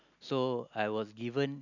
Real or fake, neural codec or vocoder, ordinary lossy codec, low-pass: real; none; none; 7.2 kHz